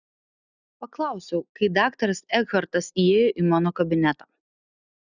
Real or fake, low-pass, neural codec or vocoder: real; 7.2 kHz; none